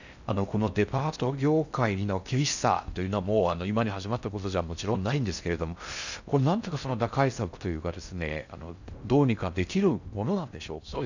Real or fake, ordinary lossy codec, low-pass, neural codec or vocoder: fake; none; 7.2 kHz; codec, 16 kHz in and 24 kHz out, 0.6 kbps, FocalCodec, streaming, 4096 codes